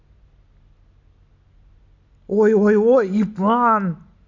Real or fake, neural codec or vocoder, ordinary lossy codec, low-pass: real; none; none; 7.2 kHz